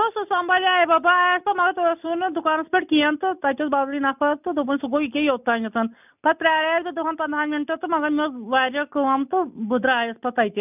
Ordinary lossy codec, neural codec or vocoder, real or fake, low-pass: none; none; real; 3.6 kHz